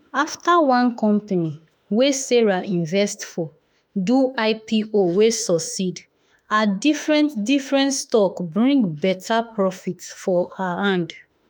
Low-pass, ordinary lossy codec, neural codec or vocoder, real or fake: none; none; autoencoder, 48 kHz, 32 numbers a frame, DAC-VAE, trained on Japanese speech; fake